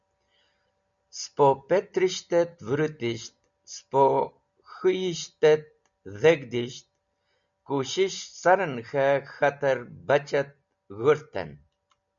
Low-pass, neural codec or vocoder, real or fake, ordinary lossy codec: 7.2 kHz; none; real; MP3, 96 kbps